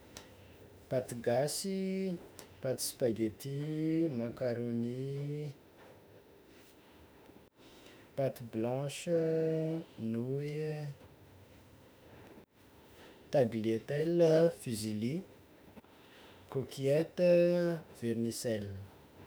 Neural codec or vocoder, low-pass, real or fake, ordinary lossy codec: autoencoder, 48 kHz, 32 numbers a frame, DAC-VAE, trained on Japanese speech; none; fake; none